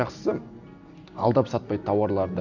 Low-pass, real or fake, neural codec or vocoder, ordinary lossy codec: 7.2 kHz; real; none; none